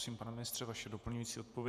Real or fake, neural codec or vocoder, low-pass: fake; vocoder, 48 kHz, 128 mel bands, Vocos; 14.4 kHz